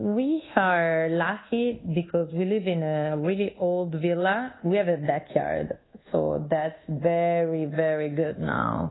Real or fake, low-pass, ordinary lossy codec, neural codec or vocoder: fake; 7.2 kHz; AAC, 16 kbps; codec, 24 kHz, 1.2 kbps, DualCodec